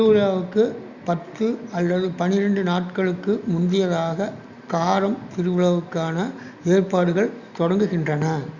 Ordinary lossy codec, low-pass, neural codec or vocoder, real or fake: Opus, 64 kbps; 7.2 kHz; none; real